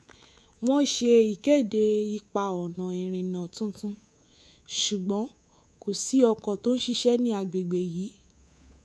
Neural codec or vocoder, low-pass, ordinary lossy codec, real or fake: codec, 24 kHz, 3.1 kbps, DualCodec; none; none; fake